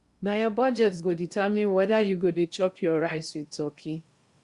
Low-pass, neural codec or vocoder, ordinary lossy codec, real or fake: 10.8 kHz; codec, 16 kHz in and 24 kHz out, 0.8 kbps, FocalCodec, streaming, 65536 codes; Opus, 64 kbps; fake